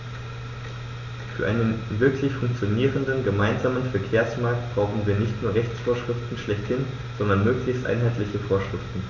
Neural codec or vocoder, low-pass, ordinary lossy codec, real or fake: none; 7.2 kHz; none; real